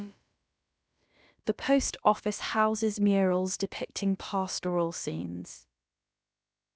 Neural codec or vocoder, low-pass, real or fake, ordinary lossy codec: codec, 16 kHz, about 1 kbps, DyCAST, with the encoder's durations; none; fake; none